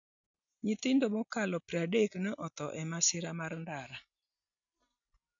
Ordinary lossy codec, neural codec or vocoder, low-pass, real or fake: none; none; 7.2 kHz; real